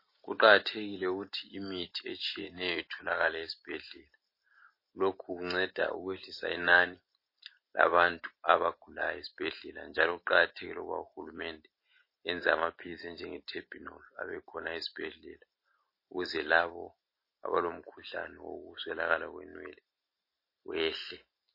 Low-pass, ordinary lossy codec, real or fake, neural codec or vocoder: 5.4 kHz; MP3, 24 kbps; real; none